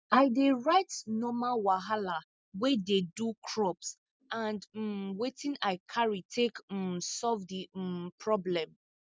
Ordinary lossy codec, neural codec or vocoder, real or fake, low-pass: none; none; real; none